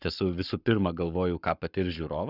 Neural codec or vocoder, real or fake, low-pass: codec, 44.1 kHz, 7.8 kbps, Pupu-Codec; fake; 5.4 kHz